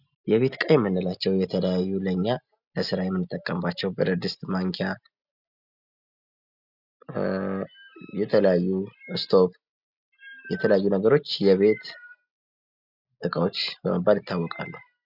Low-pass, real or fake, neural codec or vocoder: 5.4 kHz; real; none